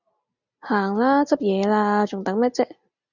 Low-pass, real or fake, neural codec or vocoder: 7.2 kHz; real; none